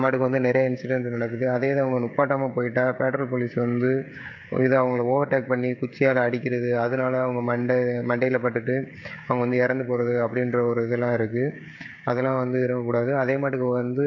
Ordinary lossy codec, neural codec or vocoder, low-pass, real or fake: MP3, 48 kbps; codec, 16 kHz, 16 kbps, FreqCodec, smaller model; 7.2 kHz; fake